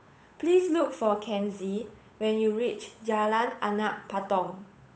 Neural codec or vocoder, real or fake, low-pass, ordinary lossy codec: codec, 16 kHz, 8 kbps, FunCodec, trained on Chinese and English, 25 frames a second; fake; none; none